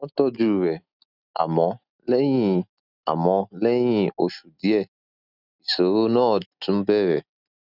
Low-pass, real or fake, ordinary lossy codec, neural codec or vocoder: 5.4 kHz; real; none; none